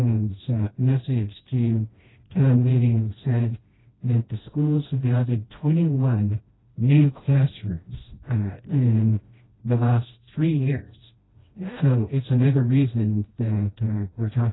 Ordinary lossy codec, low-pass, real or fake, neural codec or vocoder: AAC, 16 kbps; 7.2 kHz; fake; codec, 16 kHz, 1 kbps, FreqCodec, smaller model